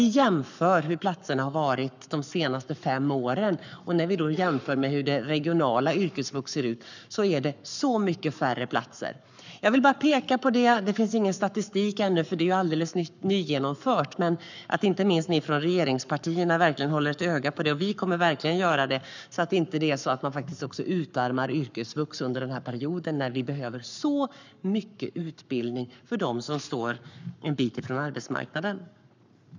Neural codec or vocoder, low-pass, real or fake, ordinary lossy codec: codec, 44.1 kHz, 7.8 kbps, Pupu-Codec; 7.2 kHz; fake; none